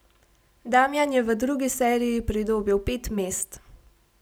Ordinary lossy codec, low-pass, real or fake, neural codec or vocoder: none; none; real; none